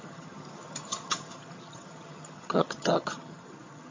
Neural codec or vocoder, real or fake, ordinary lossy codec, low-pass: vocoder, 22.05 kHz, 80 mel bands, HiFi-GAN; fake; MP3, 32 kbps; 7.2 kHz